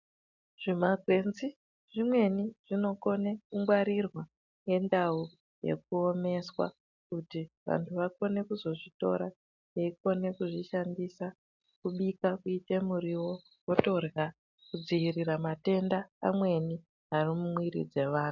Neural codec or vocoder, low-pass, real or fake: none; 7.2 kHz; real